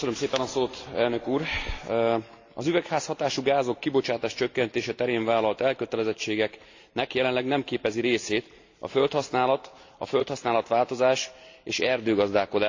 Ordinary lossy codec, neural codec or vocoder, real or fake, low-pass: AAC, 48 kbps; none; real; 7.2 kHz